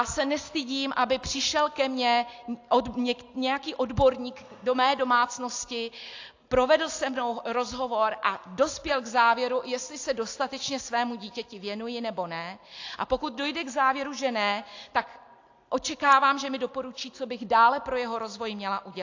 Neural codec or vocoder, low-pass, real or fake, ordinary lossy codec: none; 7.2 kHz; real; AAC, 48 kbps